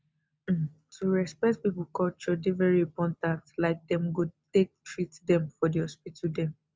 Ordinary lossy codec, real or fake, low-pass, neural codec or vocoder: none; real; none; none